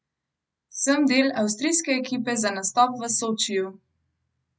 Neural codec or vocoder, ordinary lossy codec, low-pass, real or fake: none; none; none; real